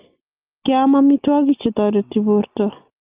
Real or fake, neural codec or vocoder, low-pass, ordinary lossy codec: real; none; 3.6 kHz; Opus, 24 kbps